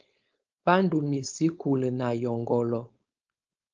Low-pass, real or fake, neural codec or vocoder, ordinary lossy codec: 7.2 kHz; fake; codec, 16 kHz, 4.8 kbps, FACodec; Opus, 24 kbps